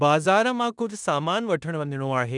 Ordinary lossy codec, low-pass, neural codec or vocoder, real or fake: none; 10.8 kHz; codec, 16 kHz in and 24 kHz out, 0.9 kbps, LongCat-Audio-Codec, four codebook decoder; fake